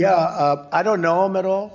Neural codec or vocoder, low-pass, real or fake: none; 7.2 kHz; real